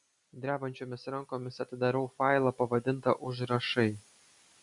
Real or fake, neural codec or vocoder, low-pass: real; none; 10.8 kHz